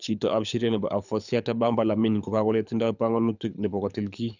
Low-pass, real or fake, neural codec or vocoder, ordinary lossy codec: 7.2 kHz; fake; codec, 24 kHz, 6 kbps, HILCodec; none